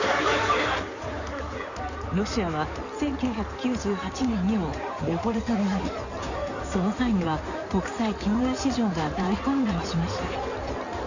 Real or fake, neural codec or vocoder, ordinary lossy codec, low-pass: fake; codec, 16 kHz in and 24 kHz out, 2.2 kbps, FireRedTTS-2 codec; none; 7.2 kHz